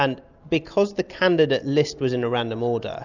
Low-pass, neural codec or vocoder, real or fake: 7.2 kHz; none; real